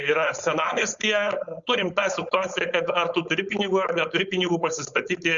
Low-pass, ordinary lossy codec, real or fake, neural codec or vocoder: 7.2 kHz; Opus, 64 kbps; fake; codec, 16 kHz, 4.8 kbps, FACodec